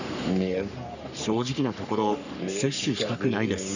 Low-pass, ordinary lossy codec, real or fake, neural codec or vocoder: 7.2 kHz; none; fake; codec, 44.1 kHz, 3.4 kbps, Pupu-Codec